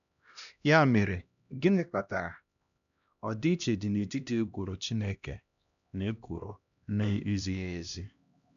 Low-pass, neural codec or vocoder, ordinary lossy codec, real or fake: 7.2 kHz; codec, 16 kHz, 1 kbps, X-Codec, HuBERT features, trained on LibriSpeech; none; fake